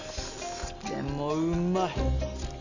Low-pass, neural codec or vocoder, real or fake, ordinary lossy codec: 7.2 kHz; none; real; none